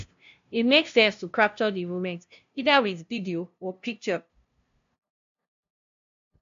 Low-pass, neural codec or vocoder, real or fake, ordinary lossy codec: 7.2 kHz; codec, 16 kHz, 0.5 kbps, FunCodec, trained on LibriTTS, 25 frames a second; fake; MP3, 64 kbps